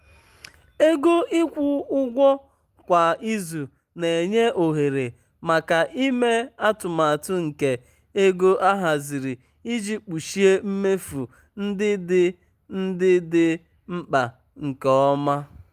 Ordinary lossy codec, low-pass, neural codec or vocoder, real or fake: Opus, 32 kbps; 14.4 kHz; none; real